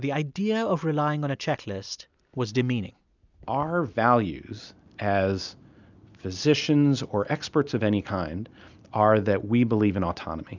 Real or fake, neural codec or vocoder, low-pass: real; none; 7.2 kHz